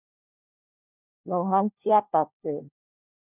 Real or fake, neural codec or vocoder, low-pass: fake; codec, 16 kHz, 1 kbps, FunCodec, trained on LibriTTS, 50 frames a second; 3.6 kHz